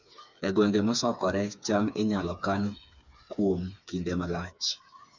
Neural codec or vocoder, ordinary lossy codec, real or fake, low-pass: codec, 16 kHz, 4 kbps, FreqCodec, smaller model; none; fake; 7.2 kHz